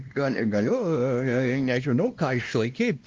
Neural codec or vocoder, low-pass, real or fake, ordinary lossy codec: codec, 16 kHz, 1 kbps, X-Codec, WavLM features, trained on Multilingual LibriSpeech; 7.2 kHz; fake; Opus, 32 kbps